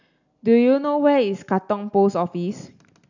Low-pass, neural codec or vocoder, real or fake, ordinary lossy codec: 7.2 kHz; none; real; none